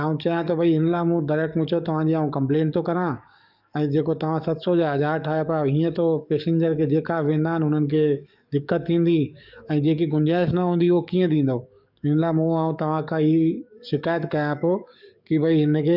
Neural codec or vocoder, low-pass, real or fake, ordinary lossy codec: codec, 44.1 kHz, 7.8 kbps, DAC; 5.4 kHz; fake; none